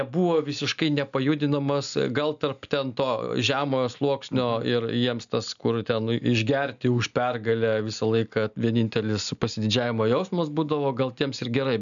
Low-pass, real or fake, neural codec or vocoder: 7.2 kHz; real; none